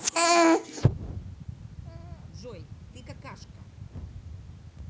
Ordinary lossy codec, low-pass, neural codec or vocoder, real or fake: none; none; none; real